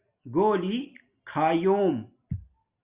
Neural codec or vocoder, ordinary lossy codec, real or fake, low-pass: none; Opus, 64 kbps; real; 3.6 kHz